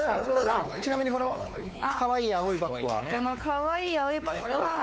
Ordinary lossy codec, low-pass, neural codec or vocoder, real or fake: none; none; codec, 16 kHz, 4 kbps, X-Codec, WavLM features, trained on Multilingual LibriSpeech; fake